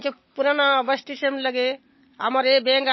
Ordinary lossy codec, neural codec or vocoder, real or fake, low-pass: MP3, 24 kbps; none; real; 7.2 kHz